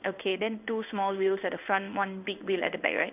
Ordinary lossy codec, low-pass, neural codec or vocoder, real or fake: none; 3.6 kHz; codec, 16 kHz in and 24 kHz out, 1 kbps, XY-Tokenizer; fake